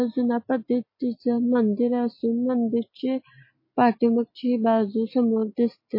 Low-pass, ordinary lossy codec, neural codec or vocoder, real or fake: 5.4 kHz; MP3, 24 kbps; none; real